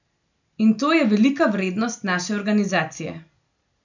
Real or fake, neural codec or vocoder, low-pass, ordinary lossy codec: real; none; 7.2 kHz; none